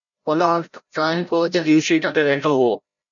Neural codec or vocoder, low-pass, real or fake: codec, 16 kHz, 0.5 kbps, FreqCodec, larger model; 7.2 kHz; fake